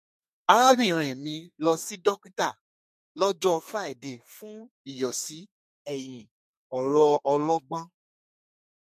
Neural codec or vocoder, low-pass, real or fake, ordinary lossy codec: codec, 32 kHz, 1.9 kbps, SNAC; 14.4 kHz; fake; MP3, 64 kbps